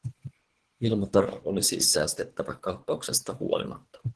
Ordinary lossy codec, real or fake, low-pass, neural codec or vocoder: Opus, 16 kbps; fake; 10.8 kHz; codec, 24 kHz, 3 kbps, HILCodec